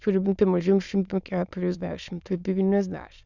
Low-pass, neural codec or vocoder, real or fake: 7.2 kHz; autoencoder, 22.05 kHz, a latent of 192 numbers a frame, VITS, trained on many speakers; fake